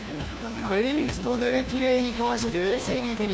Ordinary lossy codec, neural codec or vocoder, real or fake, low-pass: none; codec, 16 kHz, 1 kbps, FunCodec, trained on LibriTTS, 50 frames a second; fake; none